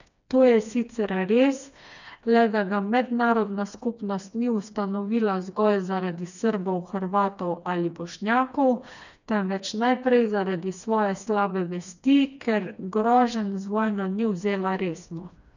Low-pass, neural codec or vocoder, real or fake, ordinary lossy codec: 7.2 kHz; codec, 16 kHz, 2 kbps, FreqCodec, smaller model; fake; none